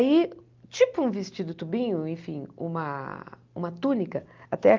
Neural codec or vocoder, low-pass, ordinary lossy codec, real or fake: none; 7.2 kHz; Opus, 32 kbps; real